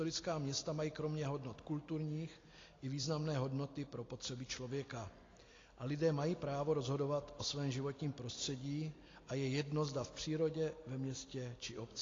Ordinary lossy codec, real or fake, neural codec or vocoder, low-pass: AAC, 32 kbps; real; none; 7.2 kHz